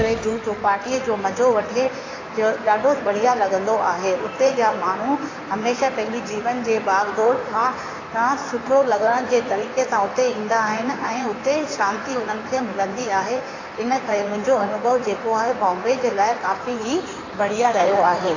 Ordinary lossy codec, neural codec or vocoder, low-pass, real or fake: AAC, 32 kbps; codec, 16 kHz in and 24 kHz out, 2.2 kbps, FireRedTTS-2 codec; 7.2 kHz; fake